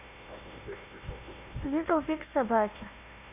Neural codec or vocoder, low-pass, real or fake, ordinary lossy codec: codec, 16 kHz, 1 kbps, FunCodec, trained on Chinese and English, 50 frames a second; 3.6 kHz; fake; MP3, 24 kbps